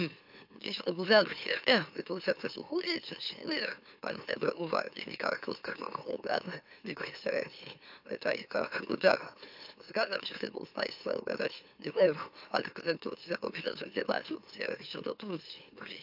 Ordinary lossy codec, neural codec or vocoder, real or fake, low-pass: AAC, 48 kbps; autoencoder, 44.1 kHz, a latent of 192 numbers a frame, MeloTTS; fake; 5.4 kHz